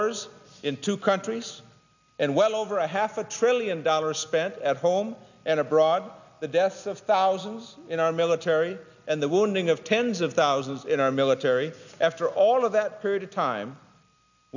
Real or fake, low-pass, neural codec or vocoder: real; 7.2 kHz; none